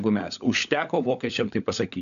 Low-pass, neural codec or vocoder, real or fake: 7.2 kHz; codec, 16 kHz, 16 kbps, FunCodec, trained on LibriTTS, 50 frames a second; fake